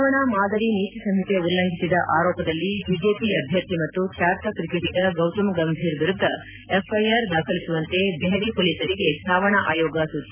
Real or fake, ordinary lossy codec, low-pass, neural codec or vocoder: real; none; 3.6 kHz; none